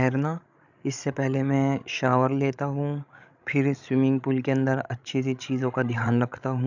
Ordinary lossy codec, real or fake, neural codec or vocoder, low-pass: none; fake; codec, 16 kHz, 16 kbps, FreqCodec, larger model; 7.2 kHz